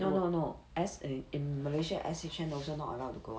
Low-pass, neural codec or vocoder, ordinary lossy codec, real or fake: none; none; none; real